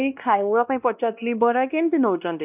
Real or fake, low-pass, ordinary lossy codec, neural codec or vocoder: fake; 3.6 kHz; none; codec, 16 kHz, 1 kbps, X-Codec, WavLM features, trained on Multilingual LibriSpeech